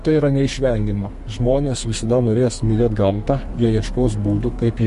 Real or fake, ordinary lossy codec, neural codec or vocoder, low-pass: fake; MP3, 48 kbps; codec, 32 kHz, 1.9 kbps, SNAC; 14.4 kHz